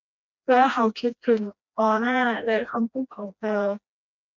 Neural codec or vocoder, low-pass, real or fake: codec, 16 kHz, 1 kbps, FreqCodec, smaller model; 7.2 kHz; fake